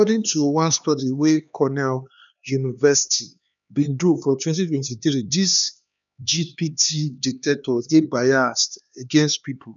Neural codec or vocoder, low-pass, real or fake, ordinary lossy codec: codec, 16 kHz, 2 kbps, X-Codec, HuBERT features, trained on LibriSpeech; 7.2 kHz; fake; none